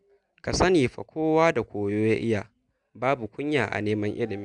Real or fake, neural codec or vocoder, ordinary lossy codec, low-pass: real; none; none; 10.8 kHz